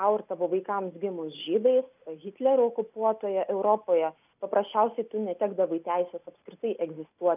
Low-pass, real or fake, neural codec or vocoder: 3.6 kHz; real; none